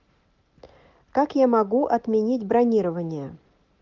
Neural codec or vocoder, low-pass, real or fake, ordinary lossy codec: none; 7.2 kHz; real; Opus, 32 kbps